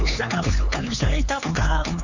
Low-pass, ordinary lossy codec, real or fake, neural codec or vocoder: 7.2 kHz; none; fake; codec, 16 kHz, 4 kbps, X-Codec, HuBERT features, trained on LibriSpeech